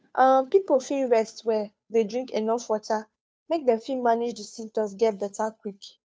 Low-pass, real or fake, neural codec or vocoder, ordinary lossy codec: none; fake; codec, 16 kHz, 2 kbps, FunCodec, trained on Chinese and English, 25 frames a second; none